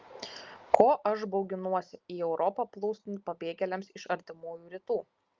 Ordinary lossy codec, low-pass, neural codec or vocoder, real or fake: Opus, 32 kbps; 7.2 kHz; none; real